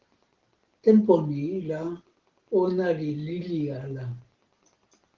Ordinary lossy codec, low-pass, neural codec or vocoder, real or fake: Opus, 16 kbps; 7.2 kHz; none; real